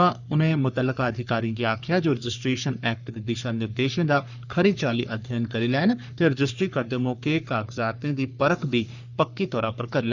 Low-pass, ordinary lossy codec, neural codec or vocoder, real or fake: 7.2 kHz; none; codec, 44.1 kHz, 3.4 kbps, Pupu-Codec; fake